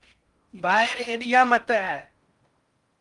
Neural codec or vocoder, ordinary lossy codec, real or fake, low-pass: codec, 16 kHz in and 24 kHz out, 0.6 kbps, FocalCodec, streaming, 4096 codes; Opus, 32 kbps; fake; 10.8 kHz